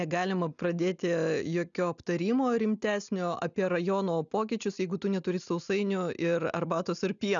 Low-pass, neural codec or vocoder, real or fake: 7.2 kHz; none; real